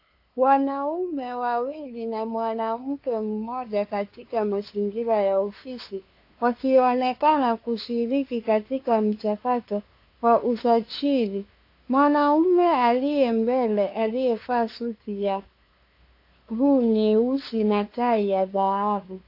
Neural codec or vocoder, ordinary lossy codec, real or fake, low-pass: codec, 16 kHz, 2 kbps, FunCodec, trained on LibriTTS, 25 frames a second; AAC, 32 kbps; fake; 5.4 kHz